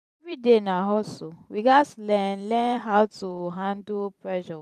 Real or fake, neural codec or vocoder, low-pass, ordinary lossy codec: fake; vocoder, 44.1 kHz, 128 mel bands every 512 samples, BigVGAN v2; 14.4 kHz; none